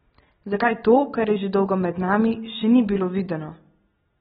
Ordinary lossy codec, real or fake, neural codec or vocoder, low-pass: AAC, 16 kbps; real; none; 19.8 kHz